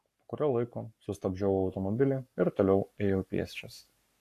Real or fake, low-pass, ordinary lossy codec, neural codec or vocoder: fake; 14.4 kHz; AAC, 64 kbps; codec, 44.1 kHz, 7.8 kbps, Pupu-Codec